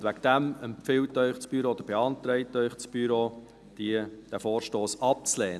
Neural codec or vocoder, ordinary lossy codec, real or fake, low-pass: none; none; real; none